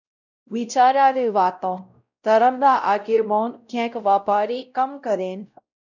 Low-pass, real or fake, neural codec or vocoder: 7.2 kHz; fake; codec, 16 kHz, 0.5 kbps, X-Codec, WavLM features, trained on Multilingual LibriSpeech